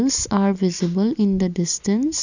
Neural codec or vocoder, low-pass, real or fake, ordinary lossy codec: none; 7.2 kHz; real; none